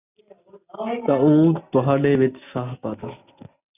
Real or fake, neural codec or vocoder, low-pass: real; none; 3.6 kHz